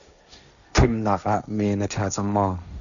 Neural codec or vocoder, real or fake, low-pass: codec, 16 kHz, 1.1 kbps, Voila-Tokenizer; fake; 7.2 kHz